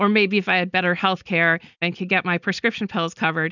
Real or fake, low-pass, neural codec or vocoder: real; 7.2 kHz; none